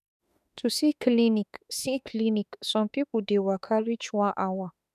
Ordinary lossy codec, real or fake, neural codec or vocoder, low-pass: none; fake; autoencoder, 48 kHz, 32 numbers a frame, DAC-VAE, trained on Japanese speech; 14.4 kHz